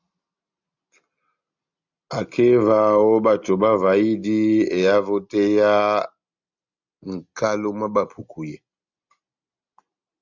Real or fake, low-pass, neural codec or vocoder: real; 7.2 kHz; none